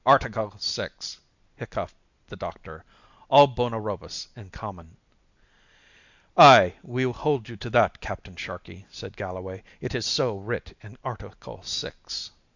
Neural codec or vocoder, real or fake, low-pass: none; real; 7.2 kHz